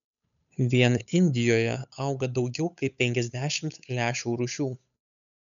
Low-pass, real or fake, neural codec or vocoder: 7.2 kHz; fake; codec, 16 kHz, 8 kbps, FunCodec, trained on Chinese and English, 25 frames a second